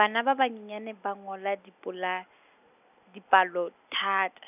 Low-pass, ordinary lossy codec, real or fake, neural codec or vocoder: 3.6 kHz; none; real; none